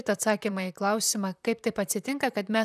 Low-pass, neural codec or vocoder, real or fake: 14.4 kHz; vocoder, 44.1 kHz, 128 mel bands, Pupu-Vocoder; fake